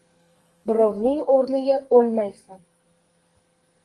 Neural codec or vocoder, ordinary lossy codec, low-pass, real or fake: codec, 44.1 kHz, 2.6 kbps, SNAC; Opus, 24 kbps; 10.8 kHz; fake